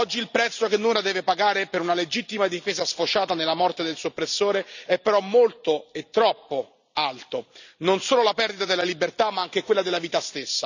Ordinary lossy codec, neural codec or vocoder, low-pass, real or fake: none; none; 7.2 kHz; real